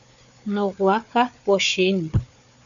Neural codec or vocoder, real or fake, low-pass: codec, 16 kHz, 4 kbps, FunCodec, trained on Chinese and English, 50 frames a second; fake; 7.2 kHz